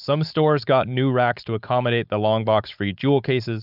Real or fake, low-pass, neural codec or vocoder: fake; 5.4 kHz; codec, 16 kHz, 4.8 kbps, FACodec